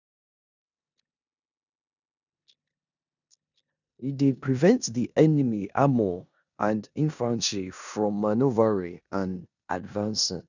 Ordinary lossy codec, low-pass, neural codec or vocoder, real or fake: none; 7.2 kHz; codec, 16 kHz in and 24 kHz out, 0.9 kbps, LongCat-Audio-Codec, four codebook decoder; fake